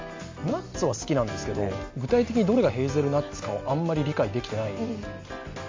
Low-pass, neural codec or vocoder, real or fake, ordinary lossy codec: 7.2 kHz; none; real; MP3, 48 kbps